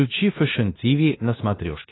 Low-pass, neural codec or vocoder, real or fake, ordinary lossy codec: 7.2 kHz; codec, 16 kHz in and 24 kHz out, 0.9 kbps, LongCat-Audio-Codec, four codebook decoder; fake; AAC, 16 kbps